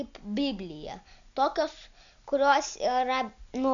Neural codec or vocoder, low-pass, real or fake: none; 7.2 kHz; real